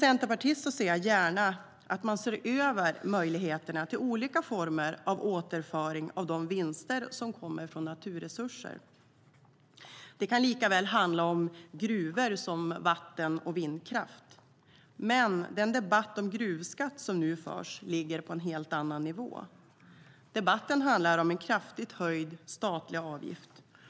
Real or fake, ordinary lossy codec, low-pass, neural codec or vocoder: real; none; none; none